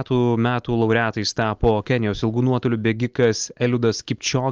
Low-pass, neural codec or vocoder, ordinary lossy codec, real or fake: 7.2 kHz; none; Opus, 24 kbps; real